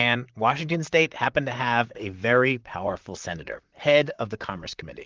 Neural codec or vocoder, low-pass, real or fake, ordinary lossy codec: vocoder, 44.1 kHz, 128 mel bands, Pupu-Vocoder; 7.2 kHz; fake; Opus, 24 kbps